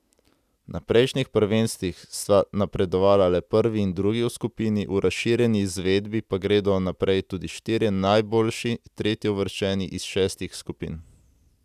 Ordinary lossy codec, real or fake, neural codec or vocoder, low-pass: none; real; none; 14.4 kHz